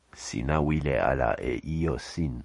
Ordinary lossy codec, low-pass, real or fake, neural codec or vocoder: MP3, 48 kbps; 10.8 kHz; fake; vocoder, 44.1 kHz, 128 mel bands every 256 samples, BigVGAN v2